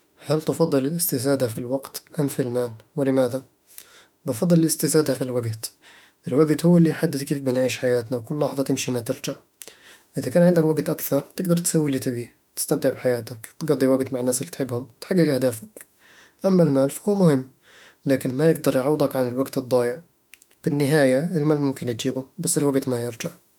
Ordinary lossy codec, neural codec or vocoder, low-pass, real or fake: none; autoencoder, 48 kHz, 32 numbers a frame, DAC-VAE, trained on Japanese speech; 19.8 kHz; fake